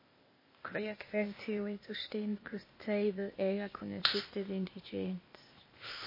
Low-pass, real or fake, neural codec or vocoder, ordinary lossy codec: 5.4 kHz; fake; codec, 16 kHz, 0.8 kbps, ZipCodec; MP3, 24 kbps